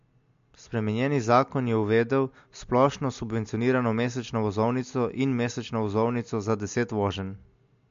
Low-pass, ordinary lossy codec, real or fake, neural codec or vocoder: 7.2 kHz; MP3, 48 kbps; real; none